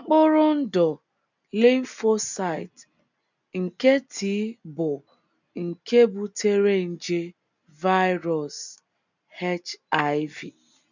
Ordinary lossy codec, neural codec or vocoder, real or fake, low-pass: none; none; real; 7.2 kHz